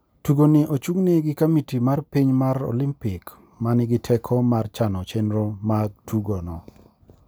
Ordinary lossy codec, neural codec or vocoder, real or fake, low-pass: none; none; real; none